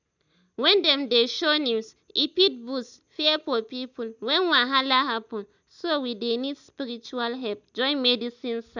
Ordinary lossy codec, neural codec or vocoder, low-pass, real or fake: none; none; 7.2 kHz; real